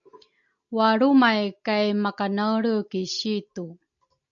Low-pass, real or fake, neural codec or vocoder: 7.2 kHz; real; none